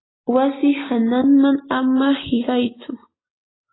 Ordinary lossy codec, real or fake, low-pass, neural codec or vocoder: AAC, 16 kbps; real; 7.2 kHz; none